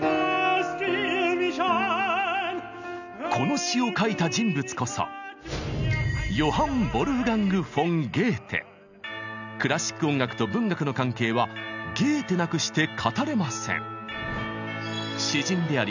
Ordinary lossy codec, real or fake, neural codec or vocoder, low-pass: none; real; none; 7.2 kHz